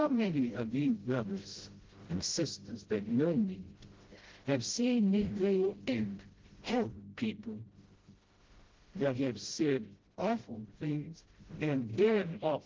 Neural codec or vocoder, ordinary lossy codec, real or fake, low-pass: codec, 16 kHz, 0.5 kbps, FreqCodec, smaller model; Opus, 16 kbps; fake; 7.2 kHz